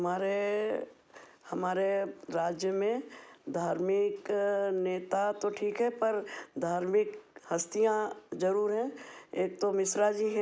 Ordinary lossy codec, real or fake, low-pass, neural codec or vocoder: none; real; none; none